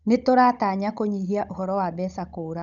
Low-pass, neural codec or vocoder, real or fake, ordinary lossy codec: 7.2 kHz; codec, 16 kHz, 16 kbps, FunCodec, trained on Chinese and English, 50 frames a second; fake; none